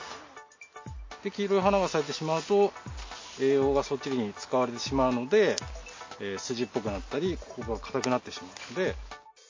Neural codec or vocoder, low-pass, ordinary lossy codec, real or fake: none; 7.2 kHz; MP3, 32 kbps; real